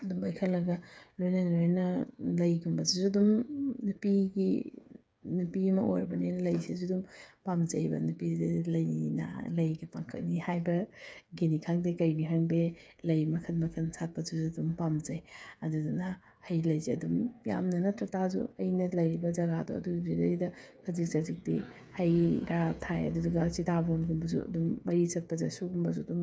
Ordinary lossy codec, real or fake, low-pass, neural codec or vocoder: none; fake; none; codec, 16 kHz, 8 kbps, FreqCodec, smaller model